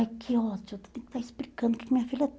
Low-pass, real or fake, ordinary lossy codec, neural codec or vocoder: none; real; none; none